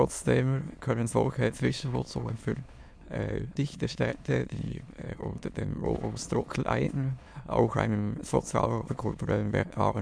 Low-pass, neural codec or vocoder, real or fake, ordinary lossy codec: none; autoencoder, 22.05 kHz, a latent of 192 numbers a frame, VITS, trained on many speakers; fake; none